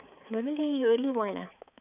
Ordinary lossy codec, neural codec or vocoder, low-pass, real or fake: none; codec, 16 kHz, 4 kbps, X-Codec, HuBERT features, trained on balanced general audio; 3.6 kHz; fake